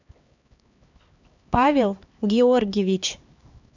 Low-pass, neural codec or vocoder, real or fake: 7.2 kHz; codec, 16 kHz, 2 kbps, X-Codec, HuBERT features, trained on LibriSpeech; fake